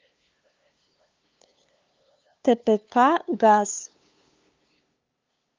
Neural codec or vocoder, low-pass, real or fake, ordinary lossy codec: codec, 16 kHz, 2 kbps, FunCodec, trained on LibriTTS, 25 frames a second; 7.2 kHz; fake; Opus, 24 kbps